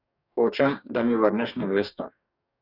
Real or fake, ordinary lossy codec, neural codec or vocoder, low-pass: fake; none; codec, 44.1 kHz, 2.6 kbps, DAC; 5.4 kHz